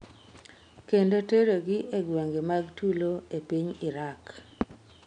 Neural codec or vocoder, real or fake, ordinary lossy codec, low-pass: none; real; none; 9.9 kHz